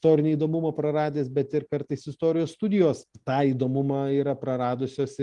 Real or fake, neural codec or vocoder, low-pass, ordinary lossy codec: real; none; 10.8 kHz; Opus, 24 kbps